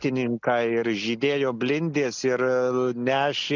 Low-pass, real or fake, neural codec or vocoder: 7.2 kHz; real; none